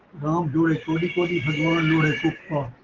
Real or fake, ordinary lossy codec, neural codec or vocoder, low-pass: real; Opus, 16 kbps; none; 7.2 kHz